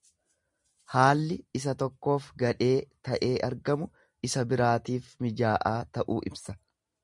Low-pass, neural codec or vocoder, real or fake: 10.8 kHz; none; real